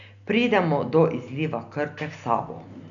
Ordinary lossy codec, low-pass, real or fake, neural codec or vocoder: none; 7.2 kHz; real; none